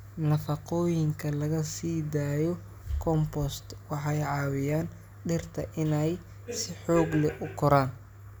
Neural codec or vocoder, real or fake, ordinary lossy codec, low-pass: none; real; none; none